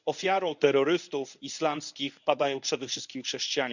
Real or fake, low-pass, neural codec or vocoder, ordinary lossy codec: fake; 7.2 kHz; codec, 24 kHz, 0.9 kbps, WavTokenizer, medium speech release version 1; none